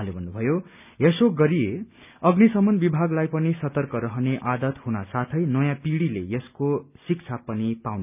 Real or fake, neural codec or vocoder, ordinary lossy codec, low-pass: real; none; none; 3.6 kHz